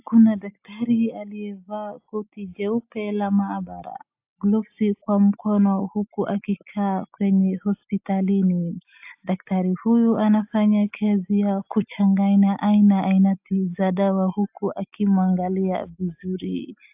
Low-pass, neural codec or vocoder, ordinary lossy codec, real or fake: 3.6 kHz; none; AAC, 32 kbps; real